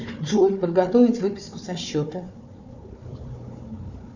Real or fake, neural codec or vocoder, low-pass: fake; codec, 16 kHz, 4 kbps, FunCodec, trained on Chinese and English, 50 frames a second; 7.2 kHz